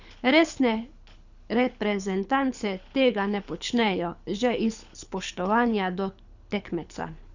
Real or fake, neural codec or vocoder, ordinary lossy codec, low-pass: fake; codec, 24 kHz, 6 kbps, HILCodec; none; 7.2 kHz